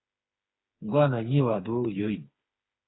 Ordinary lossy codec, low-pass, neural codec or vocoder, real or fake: AAC, 16 kbps; 7.2 kHz; codec, 16 kHz, 4 kbps, FreqCodec, smaller model; fake